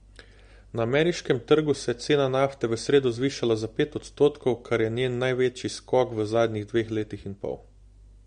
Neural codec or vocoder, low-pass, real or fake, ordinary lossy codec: none; 9.9 kHz; real; MP3, 48 kbps